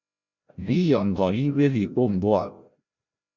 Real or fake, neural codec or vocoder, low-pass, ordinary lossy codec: fake; codec, 16 kHz, 0.5 kbps, FreqCodec, larger model; 7.2 kHz; Opus, 64 kbps